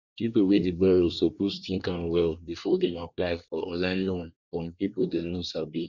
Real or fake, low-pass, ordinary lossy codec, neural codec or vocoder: fake; 7.2 kHz; none; codec, 24 kHz, 1 kbps, SNAC